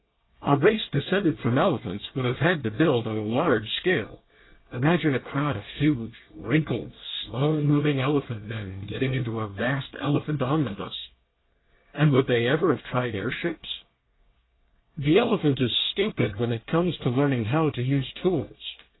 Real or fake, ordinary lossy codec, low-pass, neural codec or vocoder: fake; AAC, 16 kbps; 7.2 kHz; codec, 24 kHz, 1 kbps, SNAC